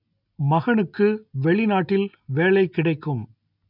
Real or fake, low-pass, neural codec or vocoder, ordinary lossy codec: real; 5.4 kHz; none; none